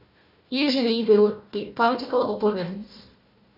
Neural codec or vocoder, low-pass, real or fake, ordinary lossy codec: codec, 16 kHz, 1 kbps, FunCodec, trained on Chinese and English, 50 frames a second; 5.4 kHz; fake; none